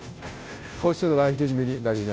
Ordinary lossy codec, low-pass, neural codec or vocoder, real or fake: none; none; codec, 16 kHz, 0.5 kbps, FunCodec, trained on Chinese and English, 25 frames a second; fake